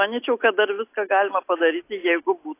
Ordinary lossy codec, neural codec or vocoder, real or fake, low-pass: AAC, 24 kbps; none; real; 3.6 kHz